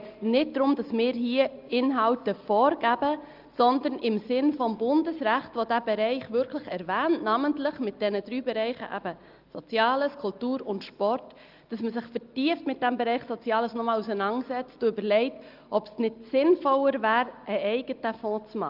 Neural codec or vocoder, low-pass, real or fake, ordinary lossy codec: none; 5.4 kHz; real; Opus, 32 kbps